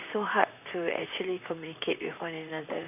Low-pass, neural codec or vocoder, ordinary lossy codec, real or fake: 3.6 kHz; vocoder, 44.1 kHz, 128 mel bands, Pupu-Vocoder; none; fake